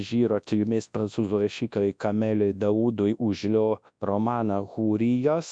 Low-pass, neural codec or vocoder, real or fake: 9.9 kHz; codec, 24 kHz, 0.9 kbps, WavTokenizer, large speech release; fake